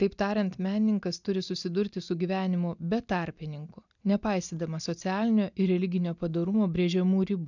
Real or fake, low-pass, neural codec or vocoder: real; 7.2 kHz; none